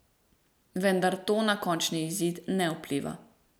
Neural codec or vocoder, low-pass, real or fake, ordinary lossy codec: none; none; real; none